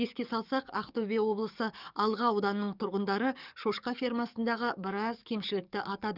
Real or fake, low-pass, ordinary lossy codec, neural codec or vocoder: fake; 5.4 kHz; none; codec, 44.1 kHz, 7.8 kbps, DAC